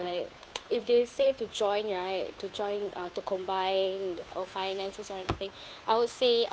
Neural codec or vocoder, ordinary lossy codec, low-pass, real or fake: codec, 16 kHz, 2 kbps, FunCodec, trained on Chinese and English, 25 frames a second; none; none; fake